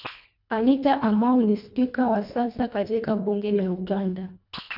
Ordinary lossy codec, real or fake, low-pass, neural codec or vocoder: none; fake; 5.4 kHz; codec, 24 kHz, 1.5 kbps, HILCodec